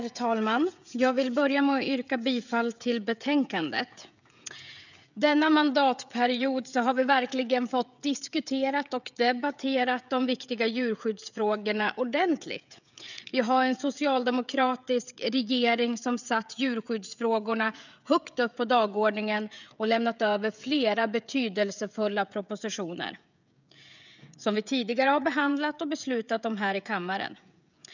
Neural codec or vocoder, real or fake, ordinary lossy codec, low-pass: codec, 16 kHz, 16 kbps, FreqCodec, smaller model; fake; none; 7.2 kHz